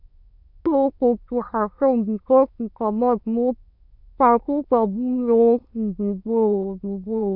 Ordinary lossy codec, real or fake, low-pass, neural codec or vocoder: none; fake; 5.4 kHz; autoencoder, 22.05 kHz, a latent of 192 numbers a frame, VITS, trained on many speakers